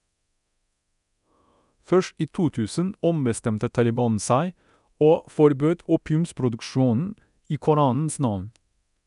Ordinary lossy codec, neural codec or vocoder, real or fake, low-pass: none; codec, 24 kHz, 0.9 kbps, DualCodec; fake; 10.8 kHz